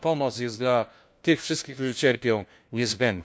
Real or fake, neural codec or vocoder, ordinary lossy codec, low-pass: fake; codec, 16 kHz, 1 kbps, FunCodec, trained on LibriTTS, 50 frames a second; none; none